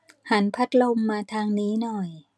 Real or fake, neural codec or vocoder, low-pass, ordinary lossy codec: real; none; none; none